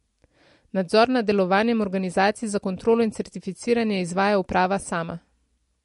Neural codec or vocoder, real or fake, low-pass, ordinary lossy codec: none; real; 14.4 kHz; MP3, 48 kbps